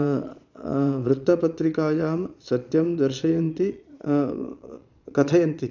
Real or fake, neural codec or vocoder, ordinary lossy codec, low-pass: fake; vocoder, 22.05 kHz, 80 mel bands, Vocos; none; 7.2 kHz